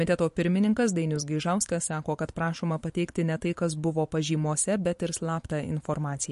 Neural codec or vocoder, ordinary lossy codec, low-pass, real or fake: vocoder, 44.1 kHz, 128 mel bands every 512 samples, BigVGAN v2; MP3, 48 kbps; 14.4 kHz; fake